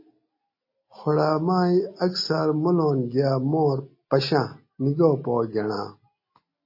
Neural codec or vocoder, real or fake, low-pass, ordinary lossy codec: none; real; 5.4 kHz; MP3, 24 kbps